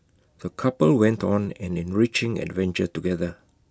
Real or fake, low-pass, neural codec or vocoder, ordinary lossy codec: real; none; none; none